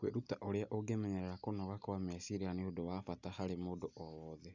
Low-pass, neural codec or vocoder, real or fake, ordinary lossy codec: 7.2 kHz; none; real; none